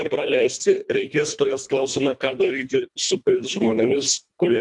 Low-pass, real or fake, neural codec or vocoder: 10.8 kHz; fake; codec, 24 kHz, 1.5 kbps, HILCodec